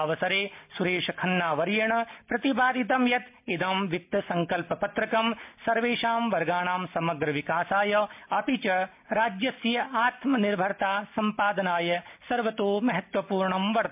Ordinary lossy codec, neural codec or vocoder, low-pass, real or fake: MP3, 32 kbps; none; 3.6 kHz; real